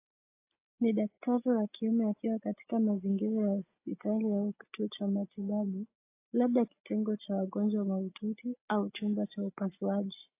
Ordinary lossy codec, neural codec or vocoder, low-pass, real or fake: AAC, 24 kbps; none; 3.6 kHz; real